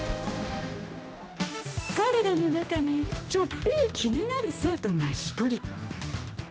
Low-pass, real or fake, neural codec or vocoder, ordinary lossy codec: none; fake; codec, 16 kHz, 1 kbps, X-Codec, HuBERT features, trained on general audio; none